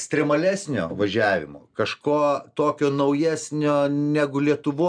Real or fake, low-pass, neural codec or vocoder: real; 9.9 kHz; none